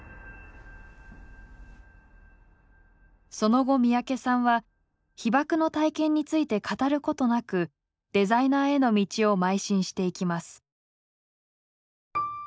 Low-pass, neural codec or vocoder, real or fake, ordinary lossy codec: none; none; real; none